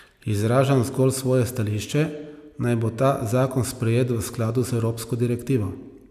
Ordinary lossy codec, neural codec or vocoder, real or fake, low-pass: none; none; real; 14.4 kHz